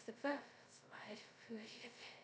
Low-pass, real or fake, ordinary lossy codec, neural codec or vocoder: none; fake; none; codec, 16 kHz, 0.2 kbps, FocalCodec